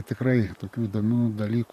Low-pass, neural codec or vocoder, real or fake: 14.4 kHz; vocoder, 44.1 kHz, 128 mel bands, Pupu-Vocoder; fake